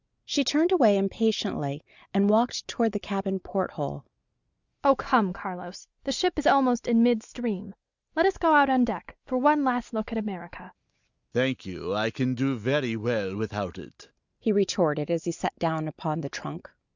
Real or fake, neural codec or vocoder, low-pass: real; none; 7.2 kHz